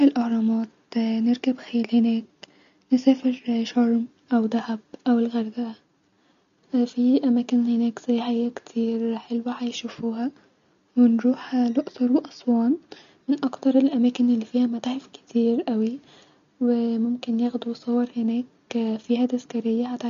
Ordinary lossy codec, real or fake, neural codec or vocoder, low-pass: MP3, 64 kbps; real; none; 7.2 kHz